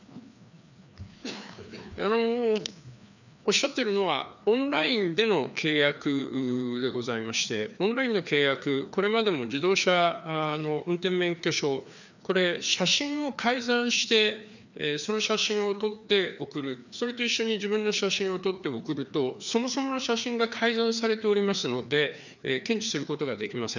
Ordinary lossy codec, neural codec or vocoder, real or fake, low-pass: none; codec, 16 kHz, 2 kbps, FreqCodec, larger model; fake; 7.2 kHz